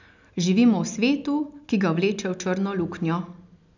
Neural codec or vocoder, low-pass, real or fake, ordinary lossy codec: none; 7.2 kHz; real; none